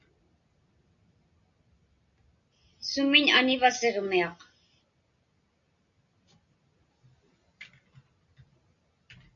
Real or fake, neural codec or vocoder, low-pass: real; none; 7.2 kHz